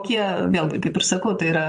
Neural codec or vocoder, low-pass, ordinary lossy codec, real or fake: vocoder, 22.05 kHz, 80 mel bands, Vocos; 9.9 kHz; MP3, 48 kbps; fake